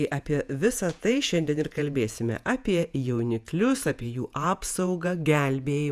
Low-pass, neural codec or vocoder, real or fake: 14.4 kHz; vocoder, 48 kHz, 128 mel bands, Vocos; fake